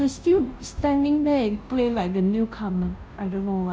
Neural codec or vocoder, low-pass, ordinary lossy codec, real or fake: codec, 16 kHz, 0.5 kbps, FunCodec, trained on Chinese and English, 25 frames a second; none; none; fake